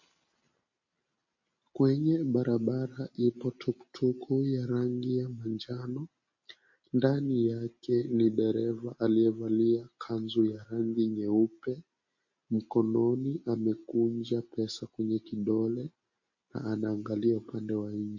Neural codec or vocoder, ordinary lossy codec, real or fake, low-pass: none; MP3, 32 kbps; real; 7.2 kHz